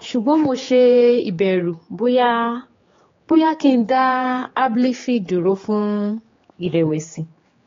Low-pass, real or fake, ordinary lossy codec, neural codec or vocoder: 7.2 kHz; fake; AAC, 32 kbps; codec, 16 kHz, 4 kbps, X-Codec, HuBERT features, trained on general audio